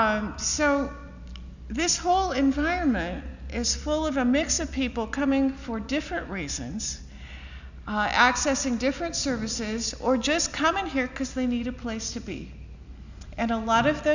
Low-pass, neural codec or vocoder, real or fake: 7.2 kHz; none; real